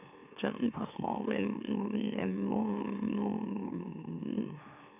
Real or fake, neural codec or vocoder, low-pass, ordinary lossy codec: fake; autoencoder, 44.1 kHz, a latent of 192 numbers a frame, MeloTTS; 3.6 kHz; none